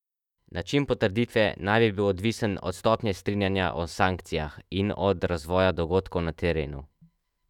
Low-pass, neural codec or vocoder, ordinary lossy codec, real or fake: 19.8 kHz; autoencoder, 48 kHz, 128 numbers a frame, DAC-VAE, trained on Japanese speech; none; fake